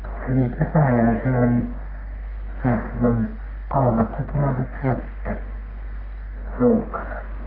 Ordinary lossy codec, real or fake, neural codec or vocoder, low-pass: none; fake; codec, 44.1 kHz, 1.7 kbps, Pupu-Codec; 5.4 kHz